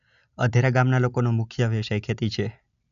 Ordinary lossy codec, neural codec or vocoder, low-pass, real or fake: none; none; 7.2 kHz; real